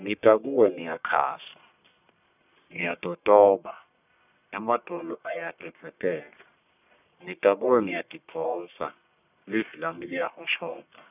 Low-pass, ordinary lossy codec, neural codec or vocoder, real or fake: 3.6 kHz; none; codec, 44.1 kHz, 1.7 kbps, Pupu-Codec; fake